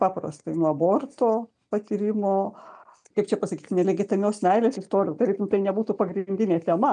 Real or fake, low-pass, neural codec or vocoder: real; 9.9 kHz; none